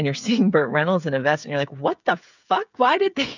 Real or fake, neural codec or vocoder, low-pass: fake; codec, 16 kHz, 8 kbps, FreqCodec, smaller model; 7.2 kHz